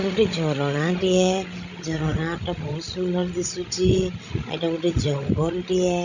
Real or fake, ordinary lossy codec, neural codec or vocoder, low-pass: fake; none; codec, 16 kHz, 16 kbps, FreqCodec, larger model; 7.2 kHz